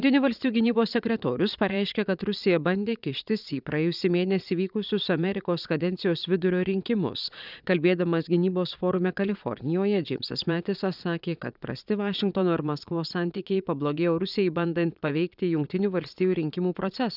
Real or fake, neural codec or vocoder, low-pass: fake; vocoder, 22.05 kHz, 80 mel bands, Vocos; 5.4 kHz